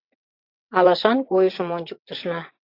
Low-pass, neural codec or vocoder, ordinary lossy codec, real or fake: 5.4 kHz; vocoder, 22.05 kHz, 80 mel bands, WaveNeXt; AAC, 32 kbps; fake